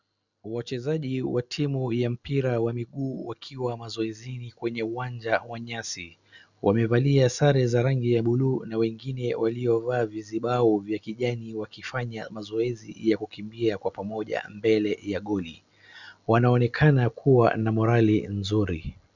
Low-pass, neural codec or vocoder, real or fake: 7.2 kHz; none; real